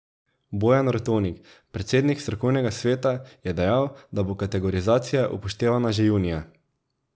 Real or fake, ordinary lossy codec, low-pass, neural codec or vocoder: real; none; none; none